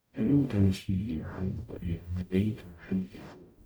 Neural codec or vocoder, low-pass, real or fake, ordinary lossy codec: codec, 44.1 kHz, 0.9 kbps, DAC; none; fake; none